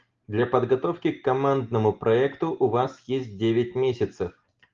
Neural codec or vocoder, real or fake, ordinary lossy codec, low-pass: none; real; Opus, 32 kbps; 7.2 kHz